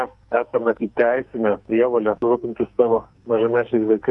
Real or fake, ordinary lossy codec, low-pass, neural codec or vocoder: fake; Opus, 64 kbps; 10.8 kHz; codec, 44.1 kHz, 2.6 kbps, SNAC